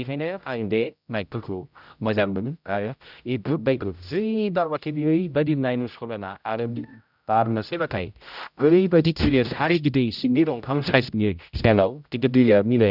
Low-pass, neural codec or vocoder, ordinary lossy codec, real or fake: 5.4 kHz; codec, 16 kHz, 0.5 kbps, X-Codec, HuBERT features, trained on general audio; none; fake